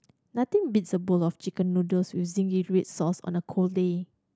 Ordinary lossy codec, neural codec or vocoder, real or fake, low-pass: none; none; real; none